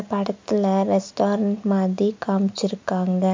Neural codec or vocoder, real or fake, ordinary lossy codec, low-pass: none; real; MP3, 48 kbps; 7.2 kHz